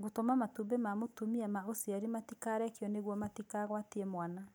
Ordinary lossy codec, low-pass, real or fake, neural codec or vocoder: none; none; real; none